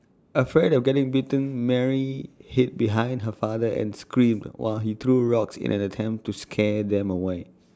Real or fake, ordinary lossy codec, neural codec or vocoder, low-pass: real; none; none; none